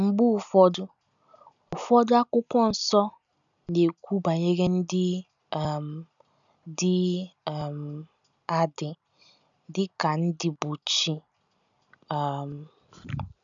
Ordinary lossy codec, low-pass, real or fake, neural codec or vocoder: none; 7.2 kHz; real; none